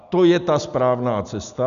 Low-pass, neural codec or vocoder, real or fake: 7.2 kHz; none; real